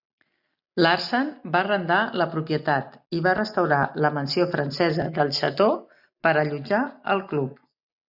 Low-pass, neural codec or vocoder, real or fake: 5.4 kHz; none; real